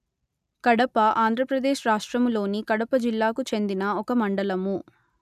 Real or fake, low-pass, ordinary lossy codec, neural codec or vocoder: real; 14.4 kHz; none; none